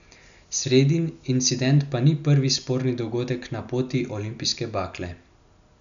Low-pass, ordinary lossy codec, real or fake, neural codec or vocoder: 7.2 kHz; none; real; none